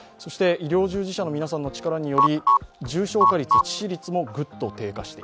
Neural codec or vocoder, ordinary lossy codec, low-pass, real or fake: none; none; none; real